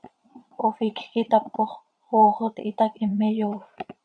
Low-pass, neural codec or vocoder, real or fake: 9.9 kHz; vocoder, 22.05 kHz, 80 mel bands, Vocos; fake